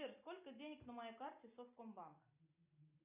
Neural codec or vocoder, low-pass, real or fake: none; 3.6 kHz; real